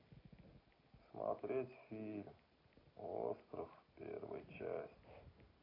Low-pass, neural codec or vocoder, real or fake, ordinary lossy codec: 5.4 kHz; none; real; AAC, 24 kbps